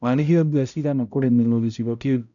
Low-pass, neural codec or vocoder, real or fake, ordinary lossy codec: 7.2 kHz; codec, 16 kHz, 0.5 kbps, X-Codec, HuBERT features, trained on balanced general audio; fake; none